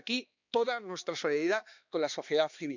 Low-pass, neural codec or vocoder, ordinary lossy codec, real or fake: 7.2 kHz; codec, 16 kHz, 2 kbps, X-Codec, HuBERT features, trained on balanced general audio; none; fake